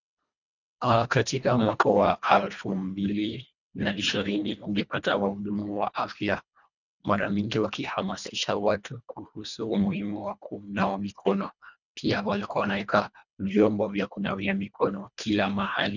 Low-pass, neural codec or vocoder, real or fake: 7.2 kHz; codec, 24 kHz, 1.5 kbps, HILCodec; fake